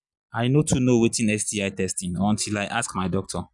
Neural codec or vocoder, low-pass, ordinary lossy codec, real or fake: none; 10.8 kHz; none; real